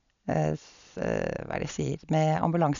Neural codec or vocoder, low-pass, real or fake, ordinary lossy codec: none; 7.2 kHz; real; MP3, 96 kbps